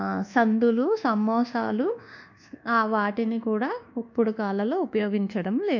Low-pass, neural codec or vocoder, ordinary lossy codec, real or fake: 7.2 kHz; codec, 24 kHz, 1.2 kbps, DualCodec; MP3, 64 kbps; fake